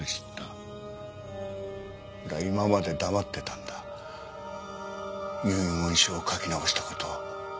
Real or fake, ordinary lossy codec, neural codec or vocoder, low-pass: real; none; none; none